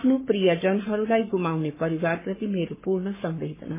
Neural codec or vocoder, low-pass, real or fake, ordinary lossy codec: codec, 44.1 kHz, 7.8 kbps, Pupu-Codec; 3.6 kHz; fake; MP3, 16 kbps